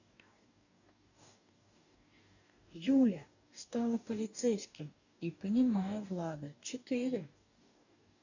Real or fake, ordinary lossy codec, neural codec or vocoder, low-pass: fake; none; codec, 44.1 kHz, 2.6 kbps, DAC; 7.2 kHz